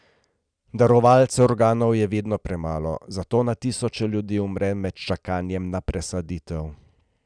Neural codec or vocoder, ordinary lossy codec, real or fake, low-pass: none; none; real; 9.9 kHz